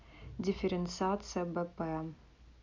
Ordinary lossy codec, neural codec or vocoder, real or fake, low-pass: none; none; real; 7.2 kHz